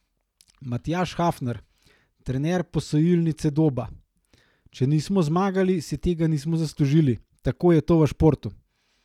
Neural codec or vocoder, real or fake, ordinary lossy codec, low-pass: none; real; none; 19.8 kHz